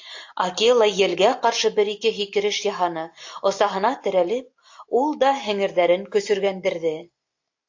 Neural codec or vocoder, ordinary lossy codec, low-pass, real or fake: none; AAC, 48 kbps; 7.2 kHz; real